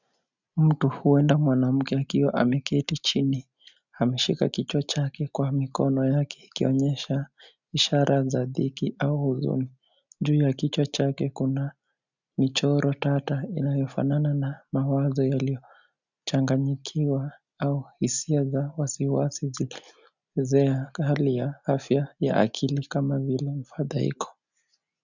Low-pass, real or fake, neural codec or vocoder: 7.2 kHz; real; none